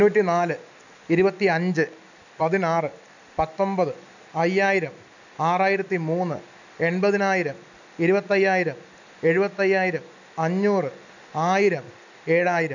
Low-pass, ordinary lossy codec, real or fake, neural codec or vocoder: 7.2 kHz; none; real; none